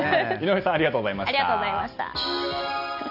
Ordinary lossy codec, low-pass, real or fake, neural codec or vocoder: none; 5.4 kHz; real; none